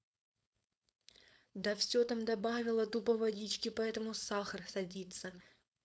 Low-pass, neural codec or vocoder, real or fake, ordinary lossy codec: none; codec, 16 kHz, 4.8 kbps, FACodec; fake; none